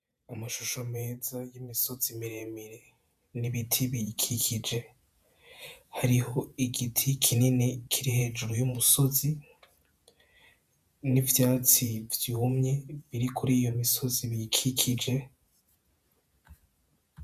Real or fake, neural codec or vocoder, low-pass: fake; vocoder, 48 kHz, 128 mel bands, Vocos; 14.4 kHz